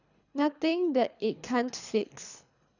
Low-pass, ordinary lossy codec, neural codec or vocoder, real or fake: 7.2 kHz; none; codec, 24 kHz, 3 kbps, HILCodec; fake